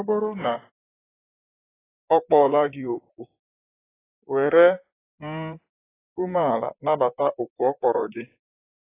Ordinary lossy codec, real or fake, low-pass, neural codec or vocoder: AAC, 16 kbps; fake; 3.6 kHz; vocoder, 44.1 kHz, 128 mel bands, Pupu-Vocoder